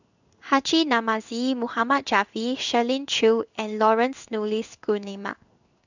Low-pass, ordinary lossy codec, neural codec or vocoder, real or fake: 7.2 kHz; none; codec, 16 kHz in and 24 kHz out, 1 kbps, XY-Tokenizer; fake